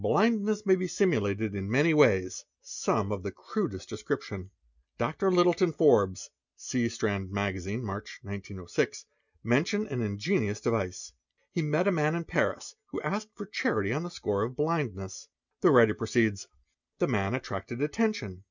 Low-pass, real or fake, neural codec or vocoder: 7.2 kHz; real; none